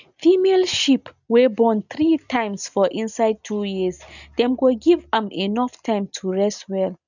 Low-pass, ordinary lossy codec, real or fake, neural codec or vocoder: 7.2 kHz; none; real; none